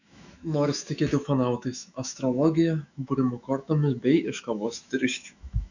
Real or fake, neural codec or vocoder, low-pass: fake; autoencoder, 48 kHz, 128 numbers a frame, DAC-VAE, trained on Japanese speech; 7.2 kHz